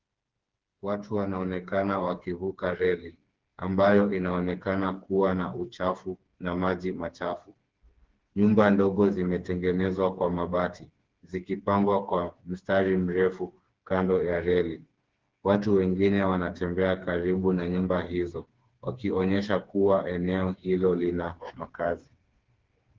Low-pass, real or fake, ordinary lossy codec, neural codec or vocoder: 7.2 kHz; fake; Opus, 16 kbps; codec, 16 kHz, 4 kbps, FreqCodec, smaller model